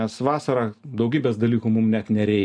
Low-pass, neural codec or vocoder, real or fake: 9.9 kHz; none; real